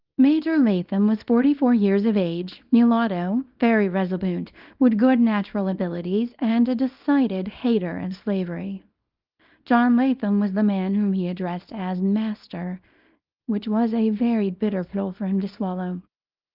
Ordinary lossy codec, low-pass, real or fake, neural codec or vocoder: Opus, 24 kbps; 5.4 kHz; fake; codec, 24 kHz, 0.9 kbps, WavTokenizer, small release